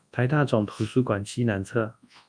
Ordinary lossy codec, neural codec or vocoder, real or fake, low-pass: MP3, 96 kbps; codec, 24 kHz, 0.9 kbps, WavTokenizer, large speech release; fake; 9.9 kHz